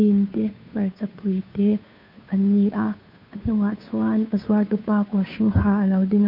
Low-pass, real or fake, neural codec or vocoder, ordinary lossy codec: 5.4 kHz; fake; codec, 16 kHz, 2 kbps, FunCodec, trained on Chinese and English, 25 frames a second; none